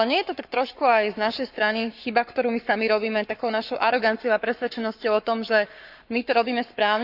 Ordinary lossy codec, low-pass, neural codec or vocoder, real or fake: none; 5.4 kHz; codec, 44.1 kHz, 7.8 kbps, Pupu-Codec; fake